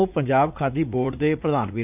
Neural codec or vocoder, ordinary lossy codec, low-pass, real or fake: vocoder, 44.1 kHz, 80 mel bands, Vocos; none; 3.6 kHz; fake